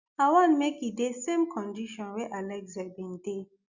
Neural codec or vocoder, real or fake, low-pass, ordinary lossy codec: none; real; 7.2 kHz; Opus, 64 kbps